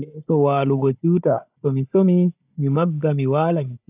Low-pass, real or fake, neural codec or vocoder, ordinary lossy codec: 3.6 kHz; fake; codec, 16 kHz, 16 kbps, FunCodec, trained on Chinese and English, 50 frames a second; AAC, 32 kbps